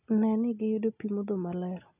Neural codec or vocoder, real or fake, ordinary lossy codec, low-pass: none; real; none; 3.6 kHz